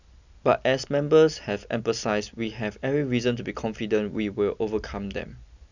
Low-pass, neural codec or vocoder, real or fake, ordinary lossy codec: 7.2 kHz; none; real; none